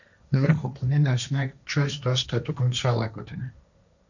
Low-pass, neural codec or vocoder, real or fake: 7.2 kHz; codec, 16 kHz, 1.1 kbps, Voila-Tokenizer; fake